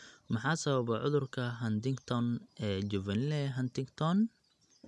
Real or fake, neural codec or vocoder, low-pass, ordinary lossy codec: real; none; none; none